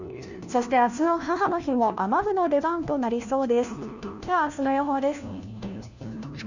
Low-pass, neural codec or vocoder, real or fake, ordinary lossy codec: 7.2 kHz; codec, 16 kHz, 1 kbps, FunCodec, trained on LibriTTS, 50 frames a second; fake; none